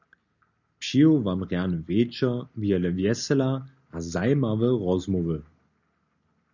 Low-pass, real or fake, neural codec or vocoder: 7.2 kHz; real; none